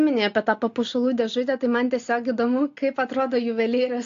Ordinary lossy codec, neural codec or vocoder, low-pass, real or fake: AAC, 48 kbps; none; 7.2 kHz; real